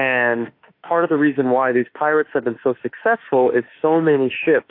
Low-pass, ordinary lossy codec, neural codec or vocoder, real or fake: 5.4 kHz; AAC, 32 kbps; autoencoder, 48 kHz, 32 numbers a frame, DAC-VAE, trained on Japanese speech; fake